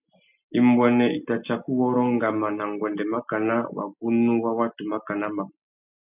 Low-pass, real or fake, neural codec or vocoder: 3.6 kHz; real; none